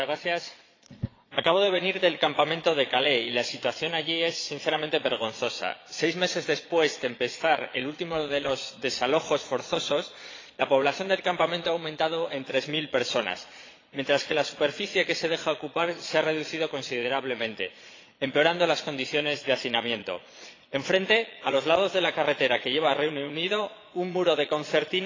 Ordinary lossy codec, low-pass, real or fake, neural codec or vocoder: AAC, 32 kbps; 7.2 kHz; fake; vocoder, 22.05 kHz, 80 mel bands, Vocos